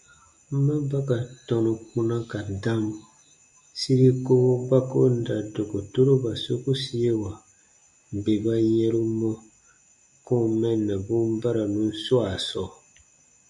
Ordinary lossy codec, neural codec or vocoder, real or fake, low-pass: MP3, 64 kbps; none; real; 10.8 kHz